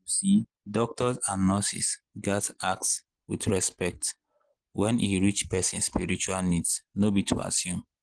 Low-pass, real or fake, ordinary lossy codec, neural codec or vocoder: 9.9 kHz; real; Opus, 16 kbps; none